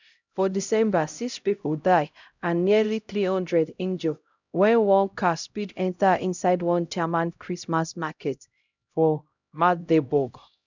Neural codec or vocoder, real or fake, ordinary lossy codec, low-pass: codec, 16 kHz, 0.5 kbps, X-Codec, HuBERT features, trained on LibriSpeech; fake; none; 7.2 kHz